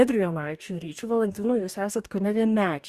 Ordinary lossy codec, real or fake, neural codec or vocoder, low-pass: Opus, 64 kbps; fake; codec, 44.1 kHz, 2.6 kbps, DAC; 14.4 kHz